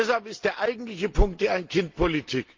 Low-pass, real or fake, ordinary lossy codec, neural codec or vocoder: 7.2 kHz; real; Opus, 32 kbps; none